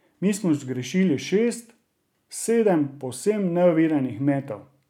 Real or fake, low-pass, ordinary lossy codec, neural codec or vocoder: real; 19.8 kHz; none; none